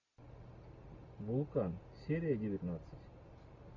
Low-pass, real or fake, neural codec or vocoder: 7.2 kHz; real; none